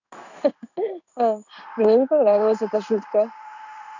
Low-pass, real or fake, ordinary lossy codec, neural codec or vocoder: 7.2 kHz; fake; none; codec, 16 kHz in and 24 kHz out, 1 kbps, XY-Tokenizer